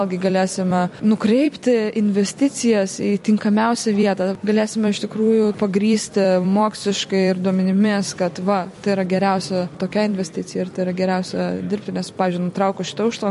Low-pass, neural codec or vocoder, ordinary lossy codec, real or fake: 14.4 kHz; vocoder, 44.1 kHz, 128 mel bands every 256 samples, BigVGAN v2; MP3, 48 kbps; fake